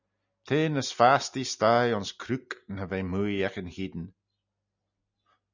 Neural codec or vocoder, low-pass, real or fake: none; 7.2 kHz; real